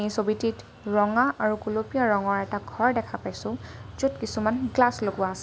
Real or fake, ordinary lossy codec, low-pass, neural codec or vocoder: real; none; none; none